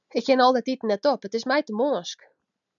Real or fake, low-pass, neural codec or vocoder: real; 7.2 kHz; none